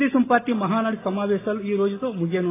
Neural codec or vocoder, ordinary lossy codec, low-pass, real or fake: none; AAC, 16 kbps; 3.6 kHz; real